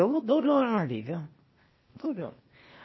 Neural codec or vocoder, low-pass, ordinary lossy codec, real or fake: codec, 16 kHz, 0.8 kbps, ZipCodec; 7.2 kHz; MP3, 24 kbps; fake